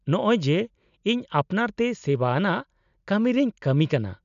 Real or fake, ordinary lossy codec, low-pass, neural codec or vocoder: real; none; 7.2 kHz; none